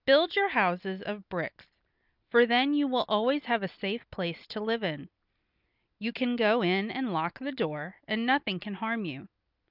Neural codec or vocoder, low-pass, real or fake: none; 5.4 kHz; real